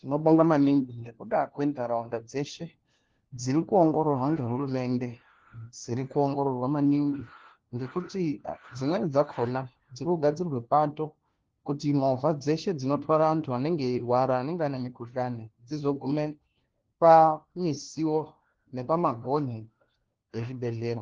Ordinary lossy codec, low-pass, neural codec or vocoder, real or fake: Opus, 16 kbps; 7.2 kHz; codec, 16 kHz, 1 kbps, FunCodec, trained on LibriTTS, 50 frames a second; fake